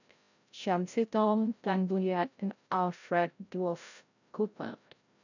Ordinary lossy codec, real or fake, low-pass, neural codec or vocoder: none; fake; 7.2 kHz; codec, 16 kHz, 0.5 kbps, FreqCodec, larger model